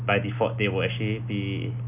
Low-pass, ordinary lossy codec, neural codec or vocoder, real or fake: 3.6 kHz; none; none; real